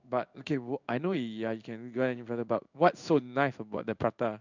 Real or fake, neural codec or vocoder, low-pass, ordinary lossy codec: fake; codec, 16 kHz in and 24 kHz out, 1 kbps, XY-Tokenizer; 7.2 kHz; none